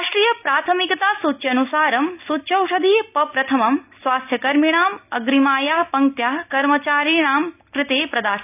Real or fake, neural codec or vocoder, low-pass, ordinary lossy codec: real; none; 3.6 kHz; none